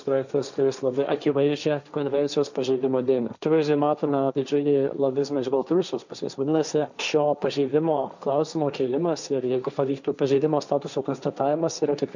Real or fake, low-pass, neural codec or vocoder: fake; 7.2 kHz; codec, 16 kHz, 1.1 kbps, Voila-Tokenizer